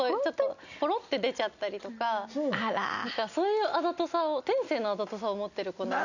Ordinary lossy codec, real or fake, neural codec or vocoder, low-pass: none; real; none; 7.2 kHz